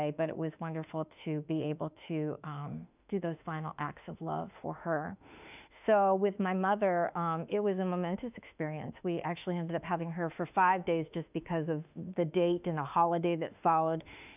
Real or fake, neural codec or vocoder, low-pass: fake; autoencoder, 48 kHz, 32 numbers a frame, DAC-VAE, trained on Japanese speech; 3.6 kHz